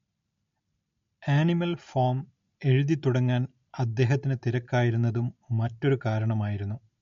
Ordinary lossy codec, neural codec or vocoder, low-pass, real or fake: MP3, 48 kbps; none; 7.2 kHz; real